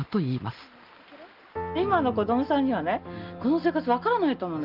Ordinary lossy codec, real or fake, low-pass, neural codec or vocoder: Opus, 32 kbps; real; 5.4 kHz; none